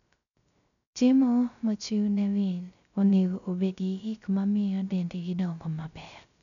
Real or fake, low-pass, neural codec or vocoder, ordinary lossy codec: fake; 7.2 kHz; codec, 16 kHz, 0.3 kbps, FocalCodec; none